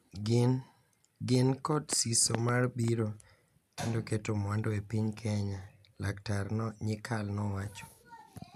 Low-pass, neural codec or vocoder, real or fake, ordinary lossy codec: 14.4 kHz; none; real; none